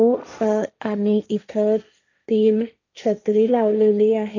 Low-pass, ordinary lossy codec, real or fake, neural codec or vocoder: 7.2 kHz; none; fake; codec, 16 kHz, 1.1 kbps, Voila-Tokenizer